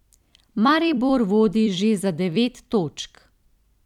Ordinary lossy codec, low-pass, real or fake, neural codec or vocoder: none; 19.8 kHz; fake; vocoder, 44.1 kHz, 128 mel bands every 256 samples, BigVGAN v2